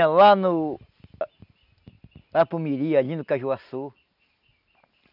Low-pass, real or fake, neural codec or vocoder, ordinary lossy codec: 5.4 kHz; real; none; none